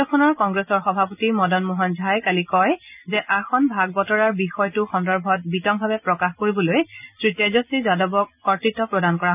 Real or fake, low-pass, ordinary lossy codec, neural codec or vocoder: real; 3.6 kHz; none; none